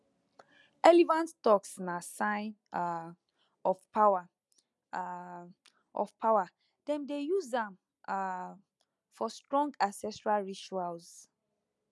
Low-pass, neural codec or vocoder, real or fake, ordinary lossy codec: none; none; real; none